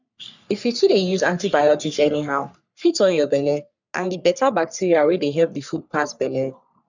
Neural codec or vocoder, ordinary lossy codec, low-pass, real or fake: codec, 44.1 kHz, 3.4 kbps, Pupu-Codec; none; 7.2 kHz; fake